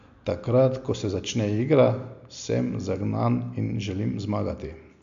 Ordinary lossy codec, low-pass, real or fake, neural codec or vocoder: MP3, 64 kbps; 7.2 kHz; real; none